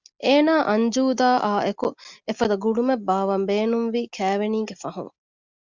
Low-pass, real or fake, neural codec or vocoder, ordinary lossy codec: 7.2 kHz; real; none; Opus, 64 kbps